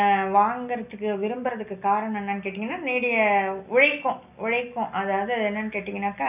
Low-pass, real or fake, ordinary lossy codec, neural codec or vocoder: 3.6 kHz; real; none; none